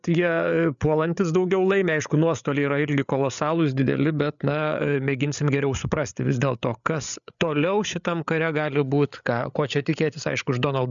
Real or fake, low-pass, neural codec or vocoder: fake; 7.2 kHz; codec, 16 kHz, 8 kbps, FreqCodec, larger model